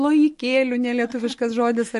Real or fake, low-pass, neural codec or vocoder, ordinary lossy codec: real; 14.4 kHz; none; MP3, 48 kbps